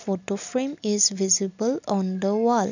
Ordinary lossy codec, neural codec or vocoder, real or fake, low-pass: none; none; real; 7.2 kHz